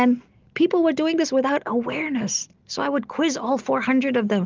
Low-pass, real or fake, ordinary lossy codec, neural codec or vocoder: 7.2 kHz; real; Opus, 32 kbps; none